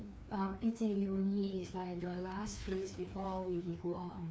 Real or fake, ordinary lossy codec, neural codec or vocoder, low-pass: fake; none; codec, 16 kHz, 2 kbps, FreqCodec, larger model; none